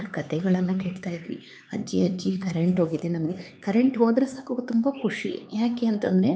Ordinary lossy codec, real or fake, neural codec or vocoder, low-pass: none; fake; codec, 16 kHz, 4 kbps, X-Codec, HuBERT features, trained on LibriSpeech; none